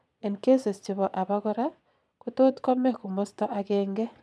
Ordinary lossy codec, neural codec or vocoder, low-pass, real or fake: none; vocoder, 22.05 kHz, 80 mel bands, Vocos; none; fake